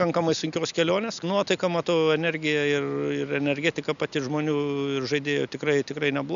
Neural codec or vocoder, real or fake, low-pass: none; real; 7.2 kHz